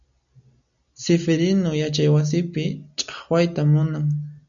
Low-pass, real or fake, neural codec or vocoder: 7.2 kHz; real; none